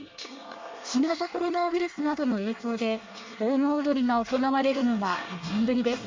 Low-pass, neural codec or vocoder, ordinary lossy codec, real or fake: 7.2 kHz; codec, 24 kHz, 1 kbps, SNAC; MP3, 64 kbps; fake